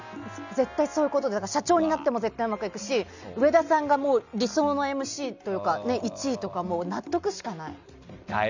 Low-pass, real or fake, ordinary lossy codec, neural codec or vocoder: 7.2 kHz; real; none; none